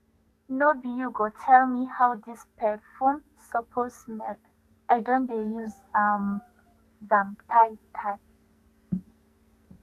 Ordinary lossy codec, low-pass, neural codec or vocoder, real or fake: none; 14.4 kHz; codec, 44.1 kHz, 2.6 kbps, SNAC; fake